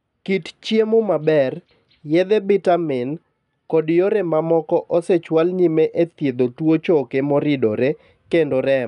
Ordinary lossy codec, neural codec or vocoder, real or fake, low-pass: none; none; real; 10.8 kHz